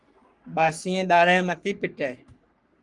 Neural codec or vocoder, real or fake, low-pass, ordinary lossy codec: codec, 44.1 kHz, 3.4 kbps, Pupu-Codec; fake; 10.8 kHz; Opus, 32 kbps